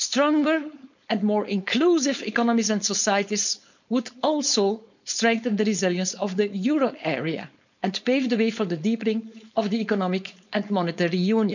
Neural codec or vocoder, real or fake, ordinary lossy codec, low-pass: codec, 16 kHz, 4.8 kbps, FACodec; fake; none; 7.2 kHz